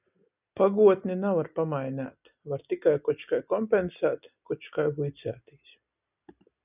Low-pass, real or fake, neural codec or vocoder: 3.6 kHz; real; none